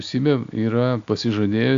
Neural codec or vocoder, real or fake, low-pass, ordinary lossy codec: none; real; 7.2 kHz; AAC, 64 kbps